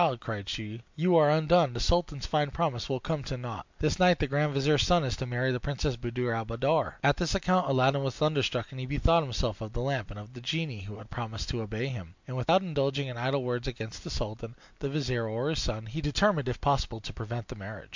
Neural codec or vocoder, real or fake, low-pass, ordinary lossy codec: none; real; 7.2 kHz; MP3, 64 kbps